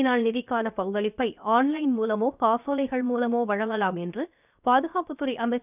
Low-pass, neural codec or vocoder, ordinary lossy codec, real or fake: 3.6 kHz; codec, 16 kHz, 0.7 kbps, FocalCodec; none; fake